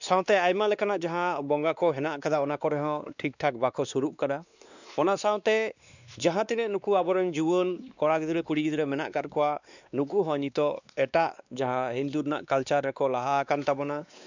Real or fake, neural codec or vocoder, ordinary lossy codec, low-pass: fake; codec, 16 kHz, 2 kbps, X-Codec, WavLM features, trained on Multilingual LibriSpeech; none; 7.2 kHz